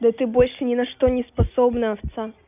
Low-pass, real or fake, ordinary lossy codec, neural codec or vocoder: 3.6 kHz; real; none; none